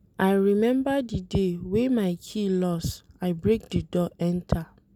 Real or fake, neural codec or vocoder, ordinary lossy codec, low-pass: real; none; none; 19.8 kHz